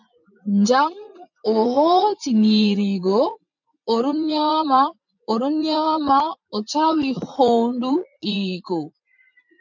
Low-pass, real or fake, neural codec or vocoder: 7.2 kHz; fake; vocoder, 44.1 kHz, 80 mel bands, Vocos